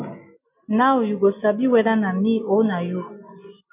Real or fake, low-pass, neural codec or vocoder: real; 3.6 kHz; none